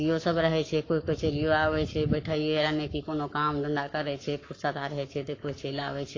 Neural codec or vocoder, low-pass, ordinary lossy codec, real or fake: codec, 44.1 kHz, 7.8 kbps, Pupu-Codec; 7.2 kHz; AAC, 32 kbps; fake